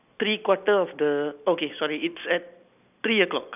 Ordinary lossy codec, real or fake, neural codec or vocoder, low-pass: none; real; none; 3.6 kHz